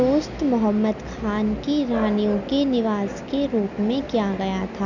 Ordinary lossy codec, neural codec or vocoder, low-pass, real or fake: none; none; 7.2 kHz; real